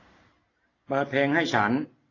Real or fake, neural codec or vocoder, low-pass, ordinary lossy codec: real; none; 7.2 kHz; AAC, 32 kbps